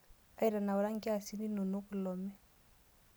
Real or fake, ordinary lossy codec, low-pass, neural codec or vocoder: real; none; none; none